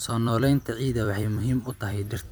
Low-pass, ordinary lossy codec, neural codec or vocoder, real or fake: none; none; vocoder, 44.1 kHz, 128 mel bands every 256 samples, BigVGAN v2; fake